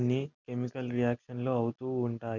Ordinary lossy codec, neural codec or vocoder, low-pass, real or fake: none; none; 7.2 kHz; real